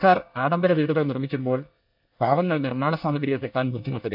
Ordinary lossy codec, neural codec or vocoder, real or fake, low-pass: AAC, 48 kbps; codec, 24 kHz, 1 kbps, SNAC; fake; 5.4 kHz